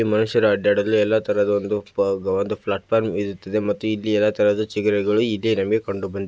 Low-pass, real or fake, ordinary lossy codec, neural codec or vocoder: none; real; none; none